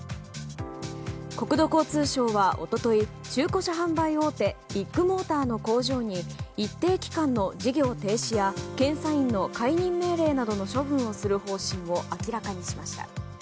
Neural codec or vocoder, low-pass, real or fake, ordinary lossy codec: none; none; real; none